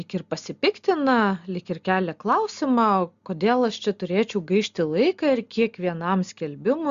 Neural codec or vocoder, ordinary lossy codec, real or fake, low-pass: none; Opus, 64 kbps; real; 7.2 kHz